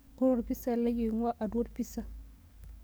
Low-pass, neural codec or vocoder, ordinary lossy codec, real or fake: none; codec, 44.1 kHz, 7.8 kbps, DAC; none; fake